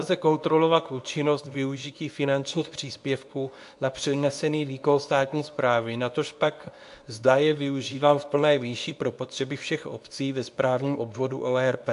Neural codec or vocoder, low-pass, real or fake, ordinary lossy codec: codec, 24 kHz, 0.9 kbps, WavTokenizer, medium speech release version 2; 10.8 kHz; fake; AAC, 64 kbps